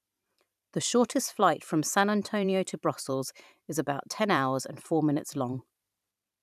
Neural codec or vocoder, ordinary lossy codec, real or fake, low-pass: vocoder, 44.1 kHz, 128 mel bands every 512 samples, BigVGAN v2; none; fake; 14.4 kHz